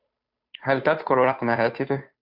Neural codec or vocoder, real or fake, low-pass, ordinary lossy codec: codec, 16 kHz, 2 kbps, FunCodec, trained on Chinese and English, 25 frames a second; fake; 5.4 kHz; AAC, 48 kbps